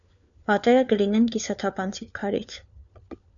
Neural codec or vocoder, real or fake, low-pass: codec, 16 kHz, 4 kbps, FunCodec, trained on LibriTTS, 50 frames a second; fake; 7.2 kHz